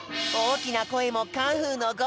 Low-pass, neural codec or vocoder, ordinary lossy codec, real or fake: none; none; none; real